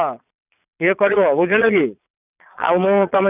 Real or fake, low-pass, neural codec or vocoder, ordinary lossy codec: fake; 3.6 kHz; vocoder, 22.05 kHz, 80 mel bands, Vocos; none